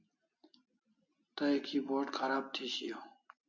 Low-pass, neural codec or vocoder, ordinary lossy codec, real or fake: 7.2 kHz; none; MP3, 48 kbps; real